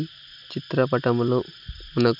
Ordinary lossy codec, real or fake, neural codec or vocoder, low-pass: none; real; none; 5.4 kHz